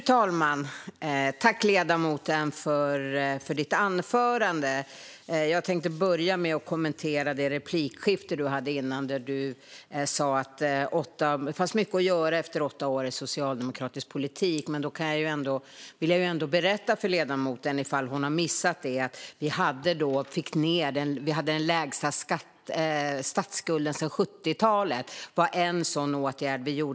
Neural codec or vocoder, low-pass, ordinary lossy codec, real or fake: none; none; none; real